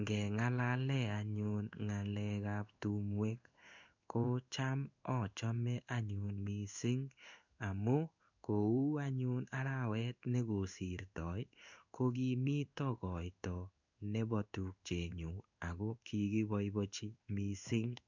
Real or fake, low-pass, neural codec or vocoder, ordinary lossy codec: fake; 7.2 kHz; codec, 16 kHz in and 24 kHz out, 1 kbps, XY-Tokenizer; none